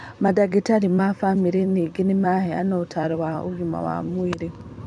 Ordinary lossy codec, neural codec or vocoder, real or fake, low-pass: none; vocoder, 44.1 kHz, 128 mel bands every 256 samples, BigVGAN v2; fake; 9.9 kHz